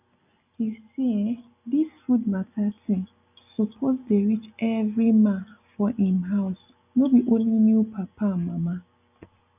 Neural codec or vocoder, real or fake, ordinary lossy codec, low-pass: none; real; none; 3.6 kHz